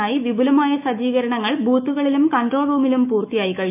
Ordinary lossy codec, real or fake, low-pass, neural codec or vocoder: none; fake; 3.6 kHz; autoencoder, 48 kHz, 128 numbers a frame, DAC-VAE, trained on Japanese speech